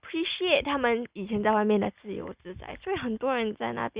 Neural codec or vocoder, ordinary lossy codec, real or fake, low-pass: none; Opus, 64 kbps; real; 3.6 kHz